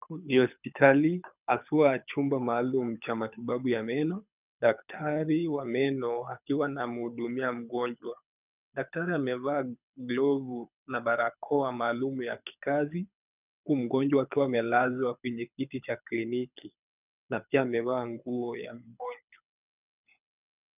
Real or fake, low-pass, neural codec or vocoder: fake; 3.6 kHz; codec, 24 kHz, 6 kbps, HILCodec